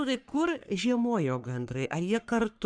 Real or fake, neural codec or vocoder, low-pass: fake; codec, 44.1 kHz, 3.4 kbps, Pupu-Codec; 9.9 kHz